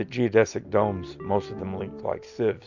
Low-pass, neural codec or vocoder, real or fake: 7.2 kHz; none; real